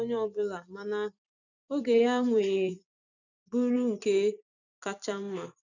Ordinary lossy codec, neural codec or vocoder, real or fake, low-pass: none; vocoder, 44.1 kHz, 128 mel bands every 256 samples, BigVGAN v2; fake; 7.2 kHz